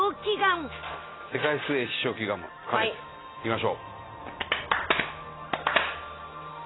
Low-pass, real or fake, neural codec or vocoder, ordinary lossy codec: 7.2 kHz; real; none; AAC, 16 kbps